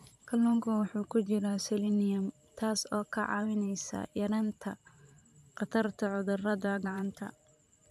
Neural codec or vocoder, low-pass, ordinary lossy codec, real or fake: vocoder, 44.1 kHz, 128 mel bands every 512 samples, BigVGAN v2; 14.4 kHz; AAC, 96 kbps; fake